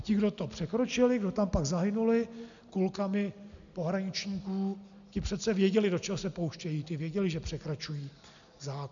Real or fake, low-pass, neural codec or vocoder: real; 7.2 kHz; none